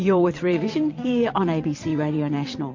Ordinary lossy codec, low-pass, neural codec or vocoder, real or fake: MP3, 48 kbps; 7.2 kHz; vocoder, 22.05 kHz, 80 mel bands, WaveNeXt; fake